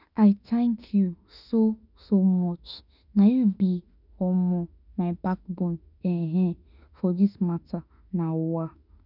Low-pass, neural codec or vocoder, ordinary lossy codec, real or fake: 5.4 kHz; autoencoder, 48 kHz, 32 numbers a frame, DAC-VAE, trained on Japanese speech; none; fake